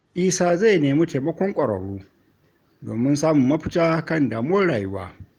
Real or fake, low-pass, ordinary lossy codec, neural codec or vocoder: real; 19.8 kHz; Opus, 24 kbps; none